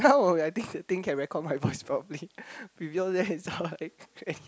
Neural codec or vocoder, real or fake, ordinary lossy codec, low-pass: none; real; none; none